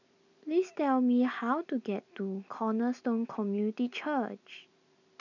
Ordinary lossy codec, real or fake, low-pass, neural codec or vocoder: none; real; 7.2 kHz; none